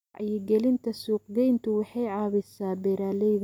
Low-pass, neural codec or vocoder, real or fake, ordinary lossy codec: 19.8 kHz; none; real; none